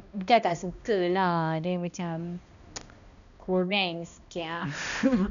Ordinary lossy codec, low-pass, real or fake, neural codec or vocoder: none; 7.2 kHz; fake; codec, 16 kHz, 1 kbps, X-Codec, HuBERT features, trained on balanced general audio